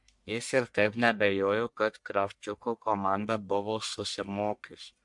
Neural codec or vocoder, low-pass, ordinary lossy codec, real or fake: codec, 44.1 kHz, 1.7 kbps, Pupu-Codec; 10.8 kHz; MP3, 64 kbps; fake